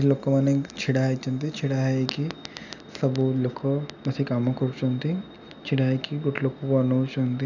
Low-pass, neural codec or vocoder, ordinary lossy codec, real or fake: 7.2 kHz; none; none; real